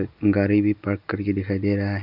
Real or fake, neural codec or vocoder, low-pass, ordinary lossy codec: real; none; 5.4 kHz; none